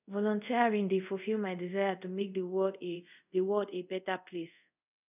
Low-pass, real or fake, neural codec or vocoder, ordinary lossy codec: 3.6 kHz; fake; codec, 24 kHz, 0.5 kbps, DualCodec; none